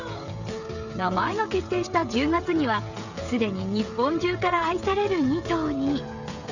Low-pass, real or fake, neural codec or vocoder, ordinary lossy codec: 7.2 kHz; fake; codec, 16 kHz, 16 kbps, FreqCodec, smaller model; none